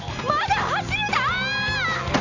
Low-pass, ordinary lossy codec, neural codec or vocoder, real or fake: 7.2 kHz; none; none; real